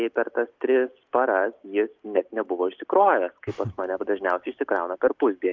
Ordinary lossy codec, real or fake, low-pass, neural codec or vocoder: Opus, 32 kbps; real; 7.2 kHz; none